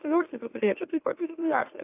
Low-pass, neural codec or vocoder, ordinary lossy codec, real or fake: 3.6 kHz; autoencoder, 44.1 kHz, a latent of 192 numbers a frame, MeloTTS; AAC, 24 kbps; fake